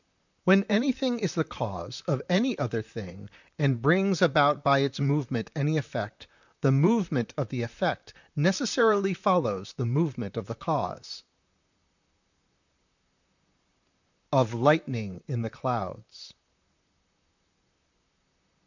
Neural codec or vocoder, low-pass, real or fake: vocoder, 44.1 kHz, 128 mel bands, Pupu-Vocoder; 7.2 kHz; fake